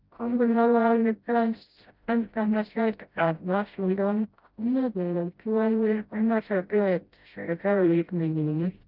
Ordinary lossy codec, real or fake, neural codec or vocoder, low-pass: Opus, 24 kbps; fake; codec, 16 kHz, 0.5 kbps, FreqCodec, smaller model; 5.4 kHz